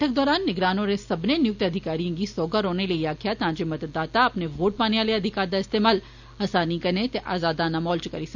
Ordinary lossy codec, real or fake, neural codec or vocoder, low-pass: none; real; none; 7.2 kHz